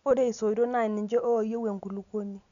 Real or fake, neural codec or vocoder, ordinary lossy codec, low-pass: real; none; none; 7.2 kHz